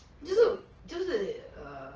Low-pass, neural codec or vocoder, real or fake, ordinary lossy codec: 7.2 kHz; none; real; Opus, 16 kbps